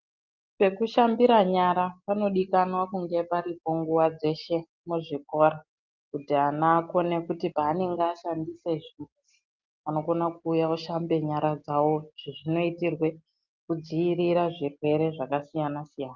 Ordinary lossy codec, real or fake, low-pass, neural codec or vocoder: Opus, 24 kbps; real; 7.2 kHz; none